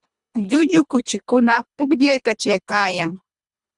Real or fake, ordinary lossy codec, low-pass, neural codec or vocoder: fake; Opus, 64 kbps; 10.8 kHz; codec, 24 kHz, 1.5 kbps, HILCodec